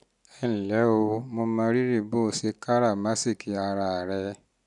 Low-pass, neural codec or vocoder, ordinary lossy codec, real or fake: 10.8 kHz; none; none; real